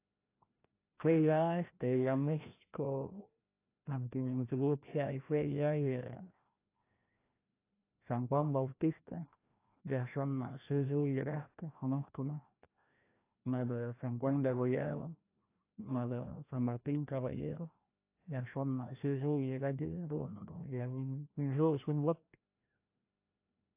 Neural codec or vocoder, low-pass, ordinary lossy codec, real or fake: codec, 16 kHz, 1 kbps, FreqCodec, larger model; 3.6 kHz; AAC, 24 kbps; fake